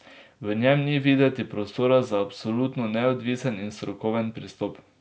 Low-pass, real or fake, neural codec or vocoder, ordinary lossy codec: none; real; none; none